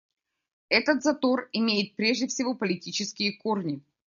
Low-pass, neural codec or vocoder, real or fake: 7.2 kHz; none; real